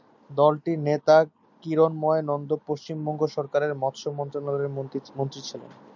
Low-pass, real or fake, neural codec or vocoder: 7.2 kHz; real; none